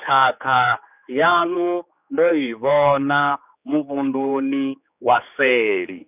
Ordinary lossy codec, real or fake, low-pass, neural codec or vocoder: none; fake; 3.6 kHz; codec, 16 kHz, 4 kbps, X-Codec, HuBERT features, trained on general audio